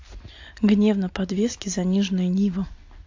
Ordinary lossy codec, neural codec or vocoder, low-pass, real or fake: AAC, 48 kbps; none; 7.2 kHz; real